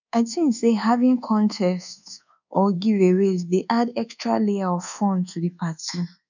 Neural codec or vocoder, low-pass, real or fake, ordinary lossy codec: codec, 24 kHz, 1.2 kbps, DualCodec; 7.2 kHz; fake; none